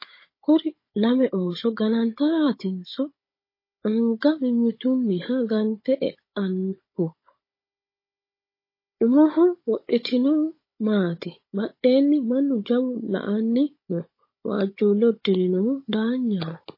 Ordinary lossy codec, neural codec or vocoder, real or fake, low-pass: MP3, 24 kbps; codec, 16 kHz, 16 kbps, FunCodec, trained on Chinese and English, 50 frames a second; fake; 5.4 kHz